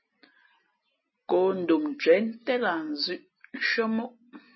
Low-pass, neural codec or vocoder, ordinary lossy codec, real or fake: 7.2 kHz; none; MP3, 24 kbps; real